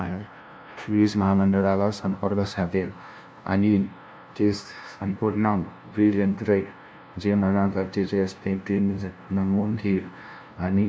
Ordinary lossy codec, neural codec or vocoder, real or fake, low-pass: none; codec, 16 kHz, 0.5 kbps, FunCodec, trained on LibriTTS, 25 frames a second; fake; none